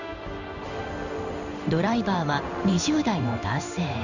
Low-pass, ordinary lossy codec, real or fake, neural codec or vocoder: 7.2 kHz; none; fake; codec, 16 kHz in and 24 kHz out, 1 kbps, XY-Tokenizer